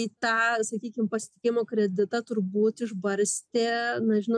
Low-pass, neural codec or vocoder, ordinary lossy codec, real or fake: 9.9 kHz; none; MP3, 96 kbps; real